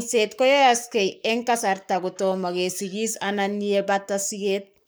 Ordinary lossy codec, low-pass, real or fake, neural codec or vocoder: none; none; fake; codec, 44.1 kHz, 7.8 kbps, Pupu-Codec